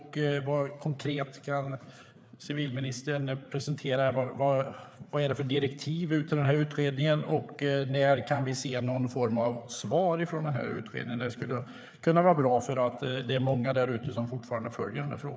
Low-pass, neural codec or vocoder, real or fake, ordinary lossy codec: none; codec, 16 kHz, 4 kbps, FreqCodec, larger model; fake; none